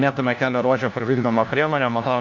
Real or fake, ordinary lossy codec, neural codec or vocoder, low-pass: fake; AAC, 48 kbps; codec, 16 kHz, 1 kbps, FunCodec, trained on LibriTTS, 50 frames a second; 7.2 kHz